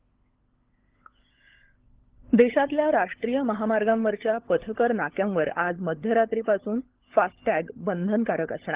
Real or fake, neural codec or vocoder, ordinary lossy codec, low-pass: fake; codec, 16 kHz, 16 kbps, FunCodec, trained on LibriTTS, 50 frames a second; Opus, 16 kbps; 3.6 kHz